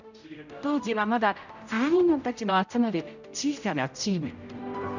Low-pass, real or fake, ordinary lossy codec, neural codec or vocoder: 7.2 kHz; fake; none; codec, 16 kHz, 0.5 kbps, X-Codec, HuBERT features, trained on general audio